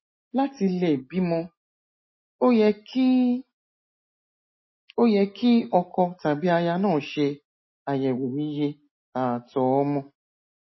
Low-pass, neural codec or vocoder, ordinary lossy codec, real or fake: 7.2 kHz; none; MP3, 24 kbps; real